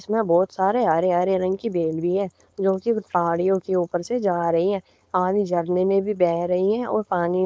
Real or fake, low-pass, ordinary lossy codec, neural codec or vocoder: fake; none; none; codec, 16 kHz, 4.8 kbps, FACodec